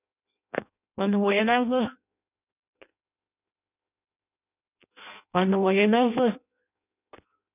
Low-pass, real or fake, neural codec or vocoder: 3.6 kHz; fake; codec, 16 kHz in and 24 kHz out, 0.6 kbps, FireRedTTS-2 codec